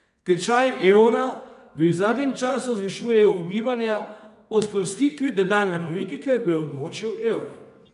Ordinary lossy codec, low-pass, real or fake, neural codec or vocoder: none; 10.8 kHz; fake; codec, 24 kHz, 0.9 kbps, WavTokenizer, medium music audio release